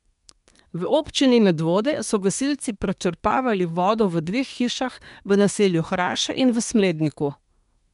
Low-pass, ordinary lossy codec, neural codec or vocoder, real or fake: 10.8 kHz; none; codec, 24 kHz, 1 kbps, SNAC; fake